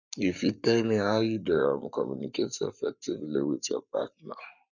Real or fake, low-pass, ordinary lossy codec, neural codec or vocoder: fake; 7.2 kHz; none; codec, 44.1 kHz, 7.8 kbps, Pupu-Codec